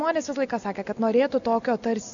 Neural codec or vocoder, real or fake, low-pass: none; real; 7.2 kHz